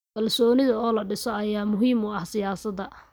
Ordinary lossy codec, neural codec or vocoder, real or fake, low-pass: none; none; real; none